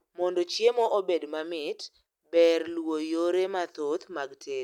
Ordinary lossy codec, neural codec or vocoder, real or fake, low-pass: none; none; real; 19.8 kHz